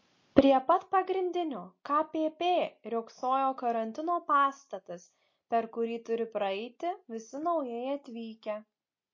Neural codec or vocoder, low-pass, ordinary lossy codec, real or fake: none; 7.2 kHz; MP3, 32 kbps; real